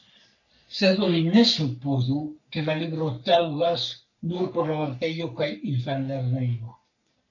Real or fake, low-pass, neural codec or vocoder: fake; 7.2 kHz; codec, 44.1 kHz, 3.4 kbps, Pupu-Codec